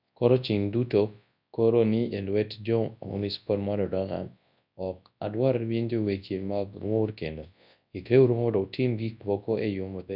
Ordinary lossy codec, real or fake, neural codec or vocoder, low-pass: none; fake; codec, 24 kHz, 0.9 kbps, WavTokenizer, large speech release; 5.4 kHz